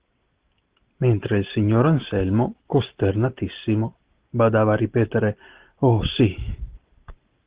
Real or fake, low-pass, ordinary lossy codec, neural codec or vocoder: real; 3.6 kHz; Opus, 16 kbps; none